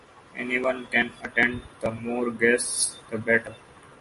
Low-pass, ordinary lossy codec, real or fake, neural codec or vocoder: 14.4 kHz; MP3, 48 kbps; real; none